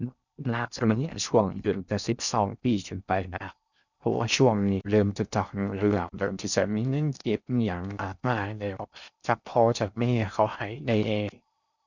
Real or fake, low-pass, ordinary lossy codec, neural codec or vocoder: fake; 7.2 kHz; none; codec, 16 kHz in and 24 kHz out, 0.6 kbps, FocalCodec, streaming, 4096 codes